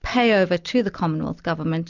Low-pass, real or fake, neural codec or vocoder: 7.2 kHz; real; none